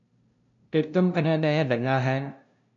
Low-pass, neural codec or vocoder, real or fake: 7.2 kHz; codec, 16 kHz, 0.5 kbps, FunCodec, trained on LibriTTS, 25 frames a second; fake